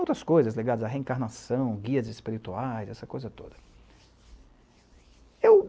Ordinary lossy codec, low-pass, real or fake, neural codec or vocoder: none; none; real; none